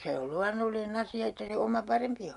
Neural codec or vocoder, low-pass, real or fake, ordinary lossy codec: none; 10.8 kHz; real; none